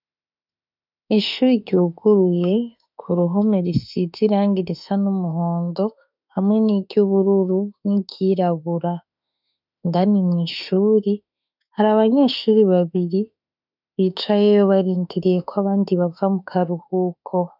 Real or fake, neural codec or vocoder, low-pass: fake; autoencoder, 48 kHz, 32 numbers a frame, DAC-VAE, trained on Japanese speech; 5.4 kHz